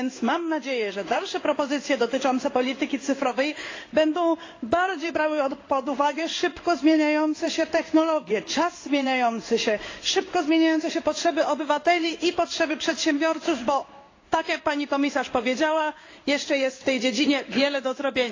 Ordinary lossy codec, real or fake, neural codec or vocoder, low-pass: AAC, 32 kbps; fake; codec, 16 kHz in and 24 kHz out, 1 kbps, XY-Tokenizer; 7.2 kHz